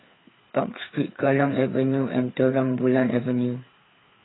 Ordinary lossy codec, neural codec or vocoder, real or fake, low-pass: AAC, 16 kbps; codec, 16 kHz, 4 kbps, FreqCodec, larger model; fake; 7.2 kHz